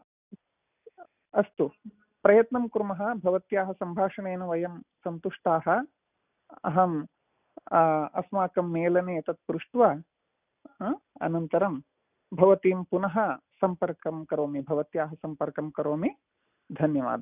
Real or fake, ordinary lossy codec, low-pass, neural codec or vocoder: real; none; 3.6 kHz; none